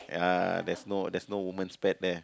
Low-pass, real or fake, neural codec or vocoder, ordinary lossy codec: none; real; none; none